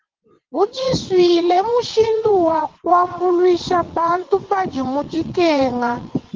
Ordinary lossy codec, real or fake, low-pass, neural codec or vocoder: Opus, 16 kbps; fake; 7.2 kHz; vocoder, 22.05 kHz, 80 mel bands, WaveNeXt